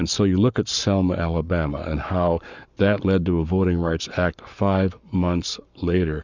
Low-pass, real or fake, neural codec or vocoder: 7.2 kHz; fake; codec, 44.1 kHz, 7.8 kbps, Pupu-Codec